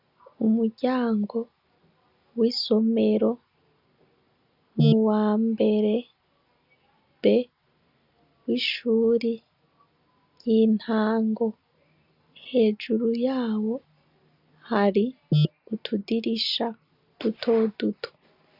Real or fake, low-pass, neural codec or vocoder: real; 5.4 kHz; none